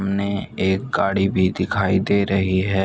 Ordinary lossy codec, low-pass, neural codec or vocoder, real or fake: none; none; none; real